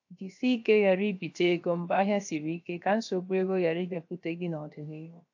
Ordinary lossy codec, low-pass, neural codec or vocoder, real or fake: AAC, 48 kbps; 7.2 kHz; codec, 16 kHz, 0.7 kbps, FocalCodec; fake